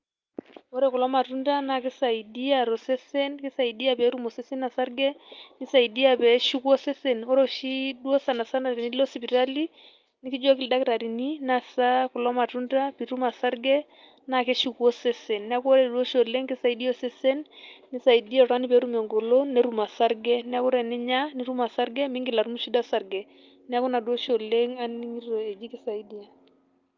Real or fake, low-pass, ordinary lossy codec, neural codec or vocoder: real; 7.2 kHz; Opus, 24 kbps; none